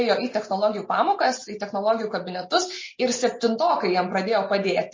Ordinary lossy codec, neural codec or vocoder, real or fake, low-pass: MP3, 32 kbps; none; real; 7.2 kHz